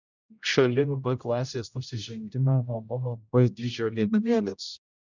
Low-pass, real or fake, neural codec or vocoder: 7.2 kHz; fake; codec, 16 kHz, 0.5 kbps, X-Codec, HuBERT features, trained on general audio